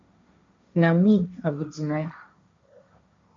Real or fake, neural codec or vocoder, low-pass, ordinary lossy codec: fake; codec, 16 kHz, 1.1 kbps, Voila-Tokenizer; 7.2 kHz; MP3, 48 kbps